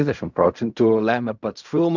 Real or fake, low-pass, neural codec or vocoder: fake; 7.2 kHz; codec, 16 kHz in and 24 kHz out, 0.4 kbps, LongCat-Audio-Codec, fine tuned four codebook decoder